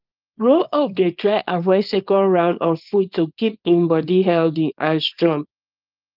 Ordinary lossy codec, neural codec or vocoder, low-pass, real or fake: Opus, 24 kbps; codec, 24 kHz, 0.9 kbps, WavTokenizer, small release; 5.4 kHz; fake